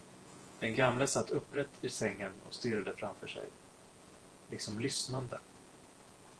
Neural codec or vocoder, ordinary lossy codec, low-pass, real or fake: vocoder, 48 kHz, 128 mel bands, Vocos; Opus, 16 kbps; 10.8 kHz; fake